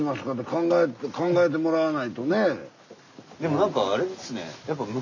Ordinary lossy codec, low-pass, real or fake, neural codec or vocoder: none; 7.2 kHz; real; none